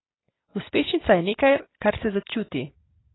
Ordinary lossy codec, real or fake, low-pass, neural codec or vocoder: AAC, 16 kbps; real; 7.2 kHz; none